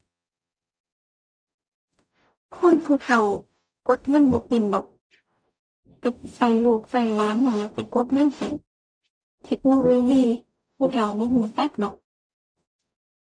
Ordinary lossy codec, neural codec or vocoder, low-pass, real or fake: none; codec, 44.1 kHz, 0.9 kbps, DAC; 9.9 kHz; fake